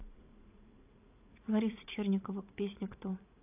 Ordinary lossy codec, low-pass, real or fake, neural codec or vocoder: AAC, 24 kbps; 3.6 kHz; fake; vocoder, 44.1 kHz, 80 mel bands, Vocos